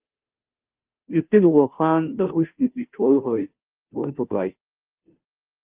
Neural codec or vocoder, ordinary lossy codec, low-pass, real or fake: codec, 16 kHz, 0.5 kbps, FunCodec, trained on Chinese and English, 25 frames a second; Opus, 32 kbps; 3.6 kHz; fake